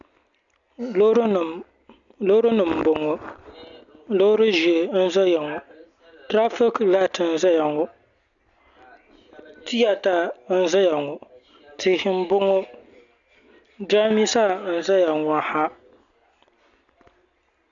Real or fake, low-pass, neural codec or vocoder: real; 7.2 kHz; none